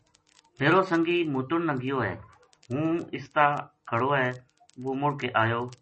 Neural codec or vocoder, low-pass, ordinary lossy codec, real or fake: none; 10.8 kHz; MP3, 32 kbps; real